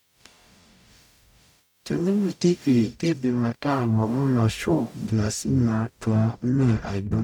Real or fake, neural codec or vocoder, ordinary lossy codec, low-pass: fake; codec, 44.1 kHz, 0.9 kbps, DAC; none; 19.8 kHz